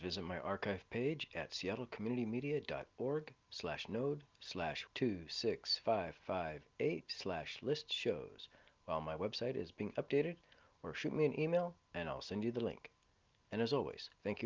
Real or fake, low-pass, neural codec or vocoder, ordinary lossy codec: real; 7.2 kHz; none; Opus, 24 kbps